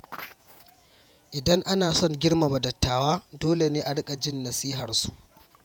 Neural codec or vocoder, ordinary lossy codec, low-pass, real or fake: vocoder, 48 kHz, 128 mel bands, Vocos; none; none; fake